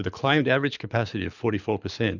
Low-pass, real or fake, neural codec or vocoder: 7.2 kHz; fake; codec, 24 kHz, 6 kbps, HILCodec